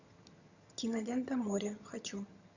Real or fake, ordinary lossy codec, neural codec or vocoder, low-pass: fake; Opus, 64 kbps; vocoder, 22.05 kHz, 80 mel bands, HiFi-GAN; 7.2 kHz